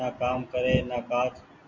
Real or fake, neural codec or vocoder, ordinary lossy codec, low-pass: real; none; MP3, 48 kbps; 7.2 kHz